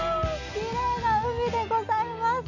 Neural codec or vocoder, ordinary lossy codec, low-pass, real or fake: none; none; 7.2 kHz; real